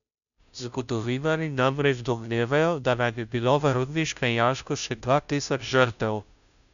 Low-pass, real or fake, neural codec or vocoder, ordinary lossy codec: 7.2 kHz; fake; codec, 16 kHz, 0.5 kbps, FunCodec, trained on Chinese and English, 25 frames a second; none